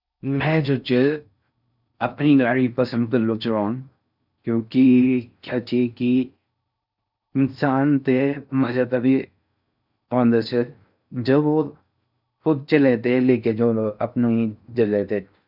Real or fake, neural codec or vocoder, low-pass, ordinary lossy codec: fake; codec, 16 kHz in and 24 kHz out, 0.6 kbps, FocalCodec, streaming, 4096 codes; 5.4 kHz; none